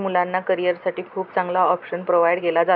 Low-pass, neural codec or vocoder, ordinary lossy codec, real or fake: 5.4 kHz; none; none; real